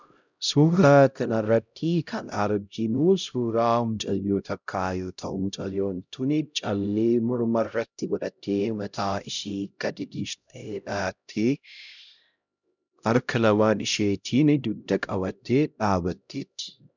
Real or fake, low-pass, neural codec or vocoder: fake; 7.2 kHz; codec, 16 kHz, 0.5 kbps, X-Codec, HuBERT features, trained on LibriSpeech